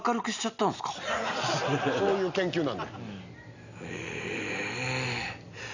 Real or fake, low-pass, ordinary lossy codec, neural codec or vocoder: real; 7.2 kHz; Opus, 64 kbps; none